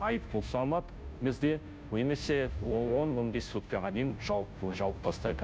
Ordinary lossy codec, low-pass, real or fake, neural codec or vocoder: none; none; fake; codec, 16 kHz, 0.5 kbps, FunCodec, trained on Chinese and English, 25 frames a second